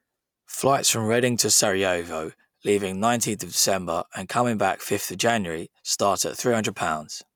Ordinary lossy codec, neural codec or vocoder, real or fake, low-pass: none; none; real; none